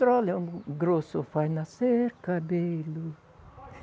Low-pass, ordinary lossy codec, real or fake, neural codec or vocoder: none; none; real; none